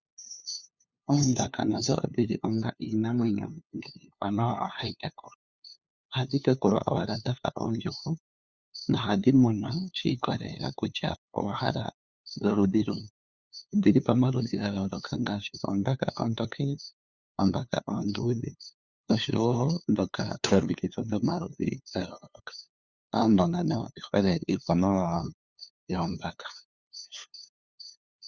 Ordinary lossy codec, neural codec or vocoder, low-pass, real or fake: Opus, 64 kbps; codec, 16 kHz, 2 kbps, FunCodec, trained on LibriTTS, 25 frames a second; 7.2 kHz; fake